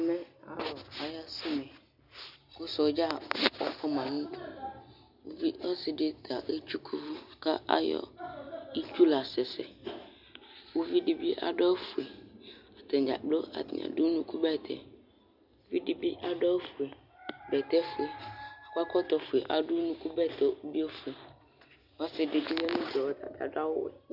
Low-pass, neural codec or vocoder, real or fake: 5.4 kHz; none; real